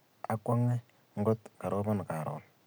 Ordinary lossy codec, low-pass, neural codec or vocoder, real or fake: none; none; none; real